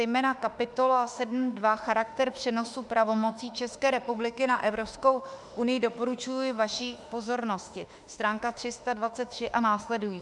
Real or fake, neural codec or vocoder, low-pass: fake; autoencoder, 48 kHz, 32 numbers a frame, DAC-VAE, trained on Japanese speech; 10.8 kHz